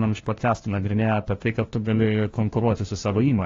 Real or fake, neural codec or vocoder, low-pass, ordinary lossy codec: fake; codec, 16 kHz, 1 kbps, FunCodec, trained on LibriTTS, 50 frames a second; 7.2 kHz; AAC, 32 kbps